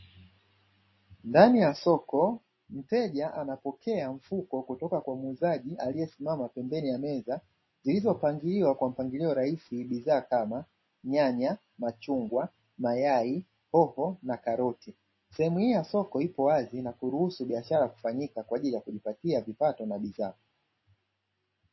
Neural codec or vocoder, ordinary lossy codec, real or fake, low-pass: none; MP3, 24 kbps; real; 7.2 kHz